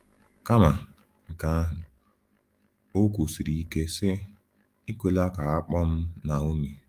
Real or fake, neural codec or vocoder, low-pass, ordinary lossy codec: fake; autoencoder, 48 kHz, 128 numbers a frame, DAC-VAE, trained on Japanese speech; 14.4 kHz; Opus, 32 kbps